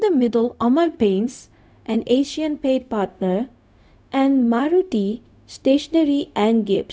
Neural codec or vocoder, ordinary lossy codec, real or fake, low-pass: codec, 16 kHz, 0.4 kbps, LongCat-Audio-Codec; none; fake; none